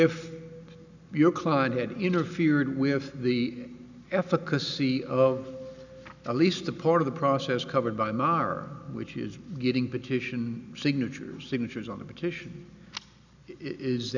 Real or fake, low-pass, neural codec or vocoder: real; 7.2 kHz; none